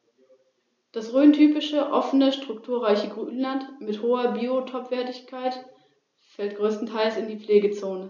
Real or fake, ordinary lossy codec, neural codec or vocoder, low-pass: real; none; none; 7.2 kHz